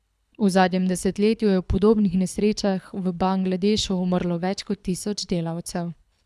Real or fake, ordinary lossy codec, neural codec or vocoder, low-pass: fake; none; codec, 24 kHz, 6 kbps, HILCodec; none